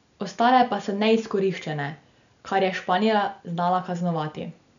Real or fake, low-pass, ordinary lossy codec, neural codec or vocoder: real; 7.2 kHz; none; none